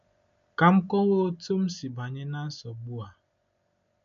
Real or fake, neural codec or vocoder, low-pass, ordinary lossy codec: real; none; 7.2 kHz; MP3, 48 kbps